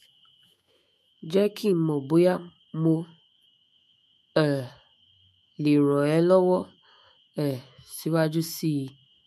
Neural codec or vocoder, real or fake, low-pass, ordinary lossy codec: autoencoder, 48 kHz, 128 numbers a frame, DAC-VAE, trained on Japanese speech; fake; 14.4 kHz; MP3, 64 kbps